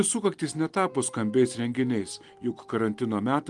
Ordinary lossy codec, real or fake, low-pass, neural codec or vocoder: Opus, 24 kbps; real; 10.8 kHz; none